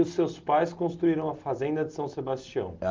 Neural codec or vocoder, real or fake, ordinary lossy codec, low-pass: none; real; Opus, 32 kbps; 7.2 kHz